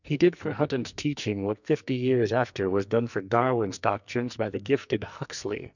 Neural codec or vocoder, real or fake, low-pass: codec, 44.1 kHz, 2.6 kbps, SNAC; fake; 7.2 kHz